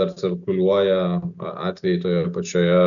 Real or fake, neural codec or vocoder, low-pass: real; none; 7.2 kHz